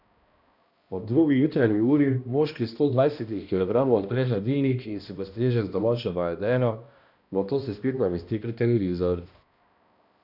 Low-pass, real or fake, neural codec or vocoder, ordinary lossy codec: 5.4 kHz; fake; codec, 16 kHz, 1 kbps, X-Codec, HuBERT features, trained on balanced general audio; none